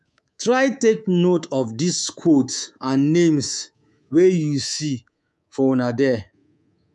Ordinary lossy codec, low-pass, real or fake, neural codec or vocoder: none; none; fake; codec, 24 kHz, 3.1 kbps, DualCodec